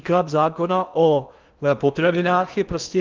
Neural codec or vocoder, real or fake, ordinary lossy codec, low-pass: codec, 16 kHz in and 24 kHz out, 0.8 kbps, FocalCodec, streaming, 65536 codes; fake; Opus, 24 kbps; 7.2 kHz